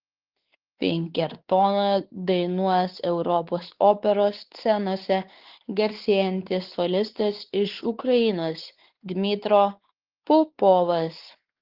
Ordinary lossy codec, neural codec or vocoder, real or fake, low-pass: Opus, 16 kbps; codec, 16 kHz, 4 kbps, X-Codec, WavLM features, trained on Multilingual LibriSpeech; fake; 5.4 kHz